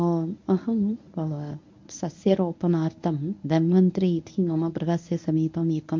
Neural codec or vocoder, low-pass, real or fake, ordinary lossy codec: codec, 24 kHz, 0.9 kbps, WavTokenizer, medium speech release version 1; 7.2 kHz; fake; MP3, 48 kbps